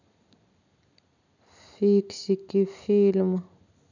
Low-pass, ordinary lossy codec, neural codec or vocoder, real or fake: 7.2 kHz; none; none; real